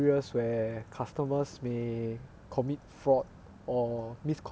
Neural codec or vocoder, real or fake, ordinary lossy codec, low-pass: none; real; none; none